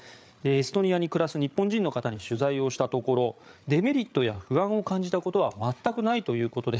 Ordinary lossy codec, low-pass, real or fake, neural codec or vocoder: none; none; fake; codec, 16 kHz, 8 kbps, FreqCodec, larger model